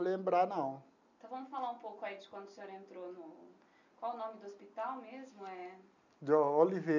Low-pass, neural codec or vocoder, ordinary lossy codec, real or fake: 7.2 kHz; none; none; real